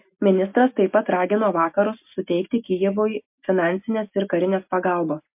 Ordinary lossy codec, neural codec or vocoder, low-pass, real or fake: MP3, 32 kbps; none; 3.6 kHz; real